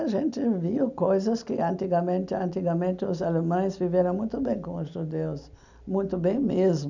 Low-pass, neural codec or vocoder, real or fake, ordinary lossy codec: 7.2 kHz; none; real; none